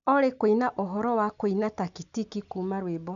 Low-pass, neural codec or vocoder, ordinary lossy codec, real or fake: 7.2 kHz; none; none; real